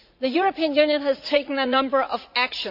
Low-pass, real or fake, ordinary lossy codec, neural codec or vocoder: 5.4 kHz; fake; none; vocoder, 44.1 kHz, 80 mel bands, Vocos